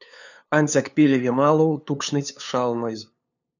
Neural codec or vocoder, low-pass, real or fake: codec, 16 kHz, 2 kbps, FunCodec, trained on LibriTTS, 25 frames a second; 7.2 kHz; fake